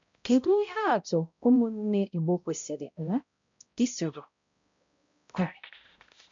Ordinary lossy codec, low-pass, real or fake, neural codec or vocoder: MP3, 64 kbps; 7.2 kHz; fake; codec, 16 kHz, 0.5 kbps, X-Codec, HuBERT features, trained on balanced general audio